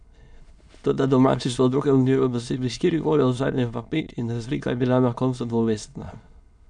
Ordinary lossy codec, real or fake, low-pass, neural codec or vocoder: none; fake; 9.9 kHz; autoencoder, 22.05 kHz, a latent of 192 numbers a frame, VITS, trained on many speakers